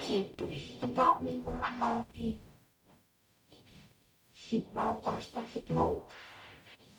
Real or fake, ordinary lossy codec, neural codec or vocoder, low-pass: fake; none; codec, 44.1 kHz, 0.9 kbps, DAC; 19.8 kHz